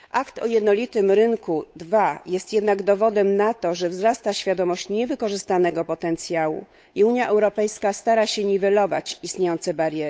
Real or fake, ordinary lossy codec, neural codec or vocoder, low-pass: fake; none; codec, 16 kHz, 8 kbps, FunCodec, trained on Chinese and English, 25 frames a second; none